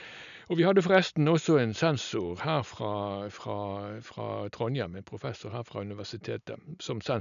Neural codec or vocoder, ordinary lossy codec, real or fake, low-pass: none; none; real; 7.2 kHz